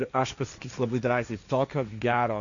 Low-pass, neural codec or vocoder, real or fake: 7.2 kHz; codec, 16 kHz, 1.1 kbps, Voila-Tokenizer; fake